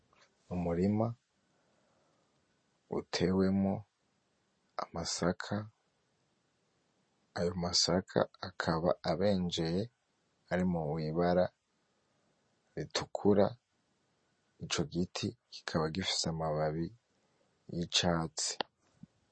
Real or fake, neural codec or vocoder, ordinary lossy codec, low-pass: real; none; MP3, 32 kbps; 9.9 kHz